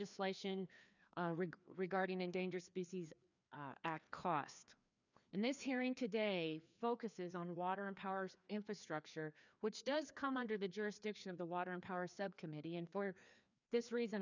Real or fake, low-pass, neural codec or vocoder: fake; 7.2 kHz; codec, 16 kHz, 2 kbps, FreqCodec, larger model